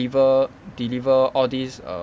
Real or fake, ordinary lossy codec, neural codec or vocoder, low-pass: real; none; none; none